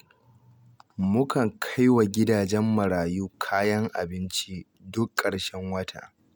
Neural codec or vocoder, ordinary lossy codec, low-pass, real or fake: none; none; none; real